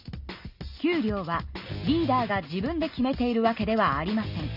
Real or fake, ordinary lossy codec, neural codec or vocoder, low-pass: real; none; none; 5.4 kHz